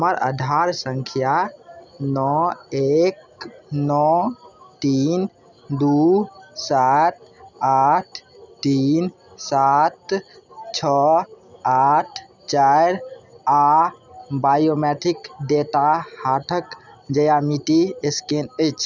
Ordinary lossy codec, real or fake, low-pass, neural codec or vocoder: none; real; 7.2 kHz; none